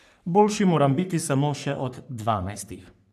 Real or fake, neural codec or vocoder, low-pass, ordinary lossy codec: fake; codec, 44.1 kHz, 3.4 kbps, Pupu-Codec; 14.4 kHz; none